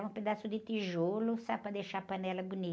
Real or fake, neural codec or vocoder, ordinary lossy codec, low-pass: real; none; none; none